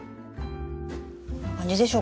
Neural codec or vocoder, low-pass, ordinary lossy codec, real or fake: none; none; none; real